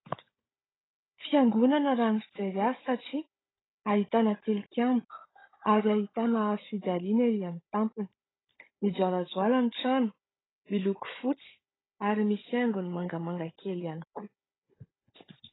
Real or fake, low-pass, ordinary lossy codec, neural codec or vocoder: fake; 7.2 kHz; AAC, 16 kbps; codec, 16 kHz, 16 kbps, FunCodec, trained on Chinese and English, 50 frames a second